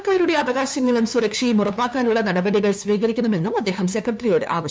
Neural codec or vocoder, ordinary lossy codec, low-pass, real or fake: codec, 16 kHz, 2 kbps, FunCodec, trained on LibriTTS, 25 frames a second; none; none; fake